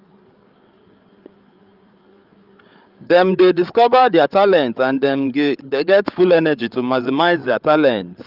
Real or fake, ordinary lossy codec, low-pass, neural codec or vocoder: fake; Opus, 24 kbps; 5.4 kHz; codec, 16 kHz, 8 kbps, FreqCodec, larger model